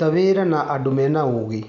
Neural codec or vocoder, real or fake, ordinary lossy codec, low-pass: none; real; none; 7.2 kHz